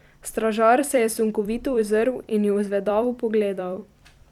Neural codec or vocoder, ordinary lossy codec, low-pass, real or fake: vocoder, 44.1 kHz, 128 mel bands every 256 samples, BigVGAN v2; none; 19.8 kHz; fake